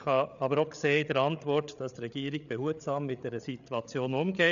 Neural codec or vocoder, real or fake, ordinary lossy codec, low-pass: codec, 16 kHz, 8 kbps, FreqCodec, larger model; fake; none; 7.2 kHz